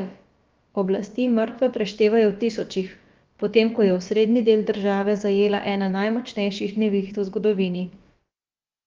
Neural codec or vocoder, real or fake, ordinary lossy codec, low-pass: codec, 16 kHz, about 1 kbps, DyCAST, with the encoder's durations; fake; Opus, 24 kbps; 7.2 kHz